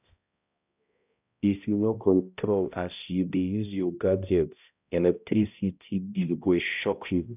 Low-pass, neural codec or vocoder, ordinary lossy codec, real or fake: 3.6 kHz; codec, 16 kHz, 0.5 kbps, X-Codec, HuBERT features, trained on balanced general audio; none; fake